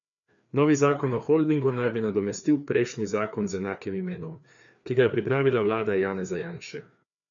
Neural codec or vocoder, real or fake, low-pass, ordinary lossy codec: codec, 16 kHz, 2 kbps, FreqCodec, larger model; fake; 7.2 kHz; MP3, 64 kbps